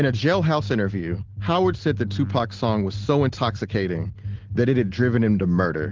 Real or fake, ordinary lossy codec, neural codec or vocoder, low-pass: real; Opus, 16 kbps; none; 7.2 kHz